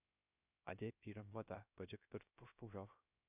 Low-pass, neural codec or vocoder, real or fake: 3.6 kHz; codec, 16 kHz, 0.3 kbps, FocalCodec; fake